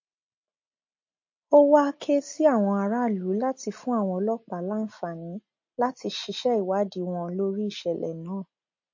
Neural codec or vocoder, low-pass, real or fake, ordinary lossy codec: none; 7.2 kHz; real; MP3, 32 kbps